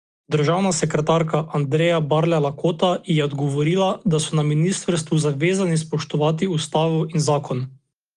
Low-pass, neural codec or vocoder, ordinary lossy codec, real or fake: 9.9 kHz; none; Opus, 24 kbps; real